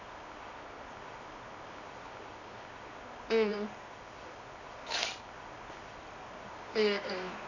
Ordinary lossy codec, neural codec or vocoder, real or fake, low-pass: none; codec, 24 kHz, 0.9 kbps, WavTokenizer, medium music audio release; fake; 7.2 kHz